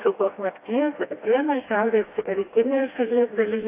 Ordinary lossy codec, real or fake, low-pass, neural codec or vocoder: AAC, 24 kbps; fake; 3.6 kHz; codec, 16 kHz, 1 kbps, FreqCodec, smaller model